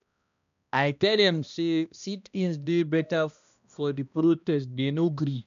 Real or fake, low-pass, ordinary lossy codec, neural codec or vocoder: fake; 7.2 kHz; none; codec, 16 kHz, 1 kbps, X-Codec, HuBERT features, trained on balanced general audio